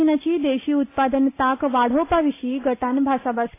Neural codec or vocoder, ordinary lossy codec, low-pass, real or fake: none; AAC, 24 kbps; 3.6 kHz; real